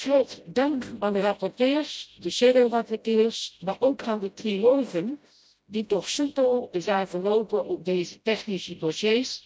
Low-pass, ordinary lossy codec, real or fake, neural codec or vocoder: none; none; fake; codec, 16 kHz, 0.5 kbps, FreqCodec, smaller model